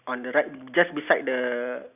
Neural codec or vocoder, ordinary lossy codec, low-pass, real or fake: none; none; 3.6 kHz; real